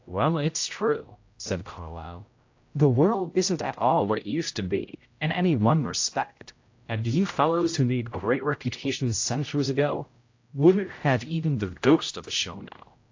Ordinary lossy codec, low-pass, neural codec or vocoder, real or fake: AAC, 48 kbps; 7.2 kHz; codec, 16 kHz, 0.5 kbps, X-Codec, HuBERT features, trained on general audio; fake